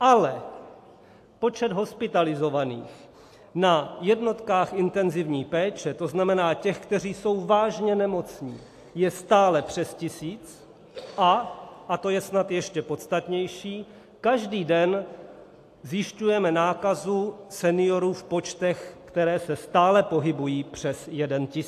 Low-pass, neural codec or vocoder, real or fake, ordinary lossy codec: 14.4 kHz; none; real; AAC, 64 kbps